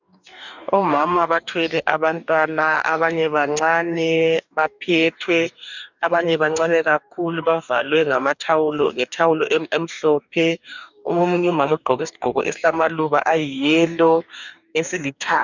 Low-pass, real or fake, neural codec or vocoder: 7.2 kHz; fake; codec, 44.1 kHz, 2.6 kbps, DAC